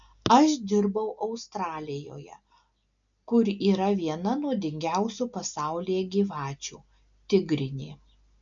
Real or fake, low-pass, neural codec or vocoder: real; 7.2 kHz; none